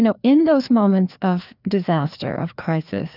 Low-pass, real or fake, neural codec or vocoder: 5.4 kHz; fake; codec, 16 kHz, 2 kbps, FreqCodec, larger model